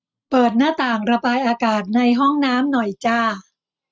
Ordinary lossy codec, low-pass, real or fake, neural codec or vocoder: none; none; real; none